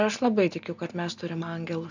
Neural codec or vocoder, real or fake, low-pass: none; real; 7.2 kHz